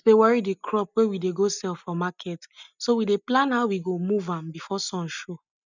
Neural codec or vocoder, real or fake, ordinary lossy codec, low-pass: none; real; none; 7.2 kHz